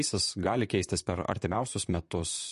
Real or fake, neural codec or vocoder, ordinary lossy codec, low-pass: fake; vocoder, 44.1 kHz, 128 mel bands, Pupu-Vocoder; MP3, 48 kbps; 14.4 kHz